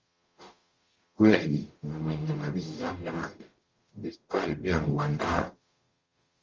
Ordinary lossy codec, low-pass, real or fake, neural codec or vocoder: Opus, 32 kbps; 7.2 kHz; fake; codec, 44.1 kHz, 0.9 kbps, DAC